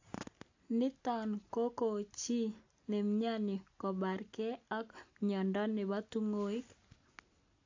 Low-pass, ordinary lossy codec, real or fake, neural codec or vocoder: 7.2 kHz; AAC, 48 kbps; real; none